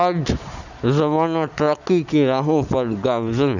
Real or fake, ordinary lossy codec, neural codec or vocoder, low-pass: real; none; none; 7.2 kHz